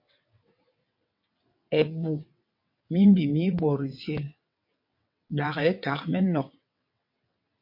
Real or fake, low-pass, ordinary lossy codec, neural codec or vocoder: fake; 5.4 kHz; MP3, 32 kbps; vocoder, 22.05 kHz, 80 mel bands, WaveNeXt